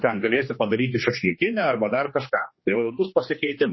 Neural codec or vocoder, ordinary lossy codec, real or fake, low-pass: codec, 16 kHz, 2 kbps, X-Codec, HuBERT features, trained on general audio; MP3, 24 kbps; fake; 7.2 kHz